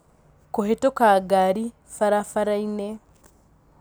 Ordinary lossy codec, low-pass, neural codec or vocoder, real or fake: none; none; none; real